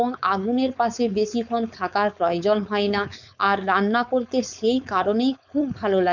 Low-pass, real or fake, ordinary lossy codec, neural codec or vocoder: 7.2 kHz; fake; none; codec, 16 kHz, 4.8 kbps, FACodec